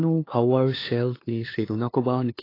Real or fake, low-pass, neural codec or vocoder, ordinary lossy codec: fake; 5.4 kHz; codec, 16 kHz, 2 kbps, FunCodec, trained on LibriTTS, 25 frames a second; AAC, 24 kbps